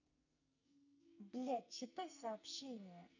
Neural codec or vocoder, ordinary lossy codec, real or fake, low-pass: codec, 44.1 kHz, 2.6 kbps, SNAC; none; fake; 7.2 kHz